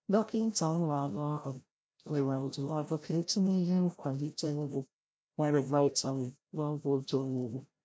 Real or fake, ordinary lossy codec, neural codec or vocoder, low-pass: fake; none; codec, 16 kHz, 0.5 kbps, FreqCodec, larger model; none